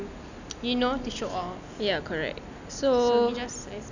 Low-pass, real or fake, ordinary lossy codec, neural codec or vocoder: 7.2 kHz; real; none; none